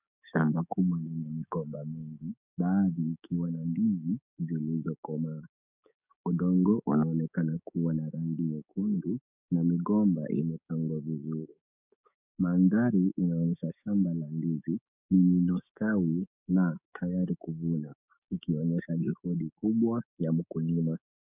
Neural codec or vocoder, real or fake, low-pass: codec, 16 kHz, 6 kbps, DAC; fake; 3.6 kHz